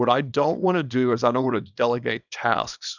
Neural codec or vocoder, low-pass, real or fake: codec, 24 kHz, 0.9 kbps, WavTokenizer, small release; 7.2 kHz; fake